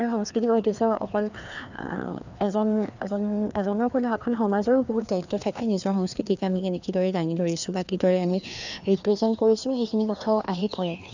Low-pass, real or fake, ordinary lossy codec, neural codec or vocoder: 7.2 kHz; fake; none; codec, 16 kHz, 2 kbps, FreqCodec, larger model